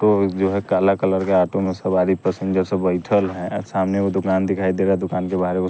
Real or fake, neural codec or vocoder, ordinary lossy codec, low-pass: real; none; none; none